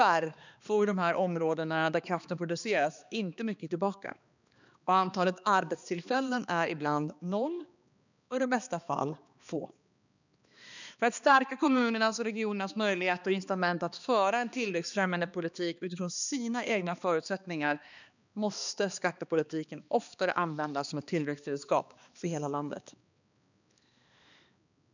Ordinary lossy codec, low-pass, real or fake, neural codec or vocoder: none; 7.2 kHz; fake; codec, 16 kHz, 2 kbps, X-Codec, HuBERT features, trained on balanced general audio